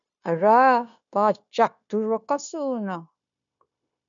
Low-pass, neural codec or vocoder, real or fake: 7.2 kHz; codec, 16 kHz, 0.9 kbps, LongCat-Audio-Codec; fake